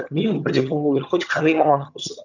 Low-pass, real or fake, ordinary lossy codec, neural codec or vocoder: 7.2 kHz; fake; none; vocoder, 22.05 kHz, 80 mel bands, HiFi-GAN